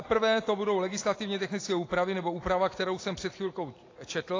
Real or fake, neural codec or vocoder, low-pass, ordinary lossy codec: real; none; 7.2 kHz; AAC, 32 kbps